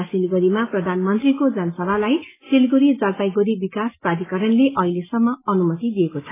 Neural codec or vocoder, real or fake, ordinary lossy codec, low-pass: none; real; AAC, 16 kbps; 3.6 kHz